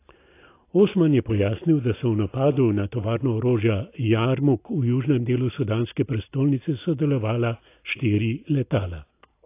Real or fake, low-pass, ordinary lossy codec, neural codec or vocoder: real; 3.6 kHz; AAC, 24 kbps; none